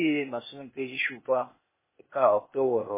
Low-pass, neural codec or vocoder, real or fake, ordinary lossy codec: 3.6 kHz; codec, 16 kHz, 0.8 kbps, ZipCodec; fake; MP3, 16 kbps